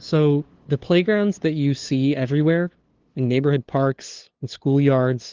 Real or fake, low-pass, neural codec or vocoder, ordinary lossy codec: fake; 7.2 kHz; codec, 16 kHz, 2 kbps, FunCodec, trained on LibriTTS, 25 frames a second; Opus, 16 kbps